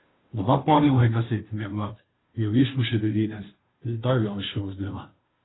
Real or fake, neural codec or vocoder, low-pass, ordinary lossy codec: fake; codec, 16 kHz, 0.5 kbps, FunCodec, trained on Chinese and English, 25 frames a second; 7.2 kHz; AAC, 16 kbps